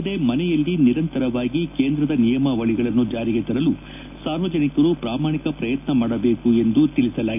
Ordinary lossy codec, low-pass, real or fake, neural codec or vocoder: none; 3.6 kHz; real; none